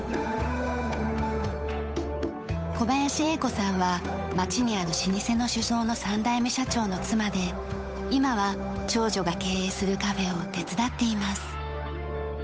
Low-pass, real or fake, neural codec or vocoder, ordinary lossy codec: none; fake; codec, 16 kHz, 8 kbps, FunCodec, trained on Chinese and English, 25 frames a second; none